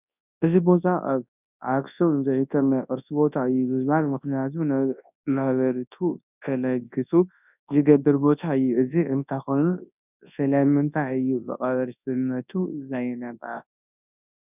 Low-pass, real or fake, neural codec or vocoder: 3.6 kHz; fake; codec, 24 kHz, 0.9 kbps, WavTokenizer, large speech release